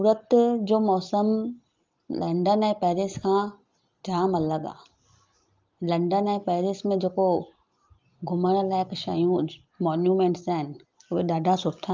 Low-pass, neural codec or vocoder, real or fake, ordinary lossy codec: 7.2 kHz; none; real; Opus, 32 kbps